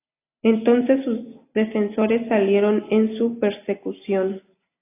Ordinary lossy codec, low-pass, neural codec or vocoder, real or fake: AAC, 24 kbps; 3.6 kHz; none; real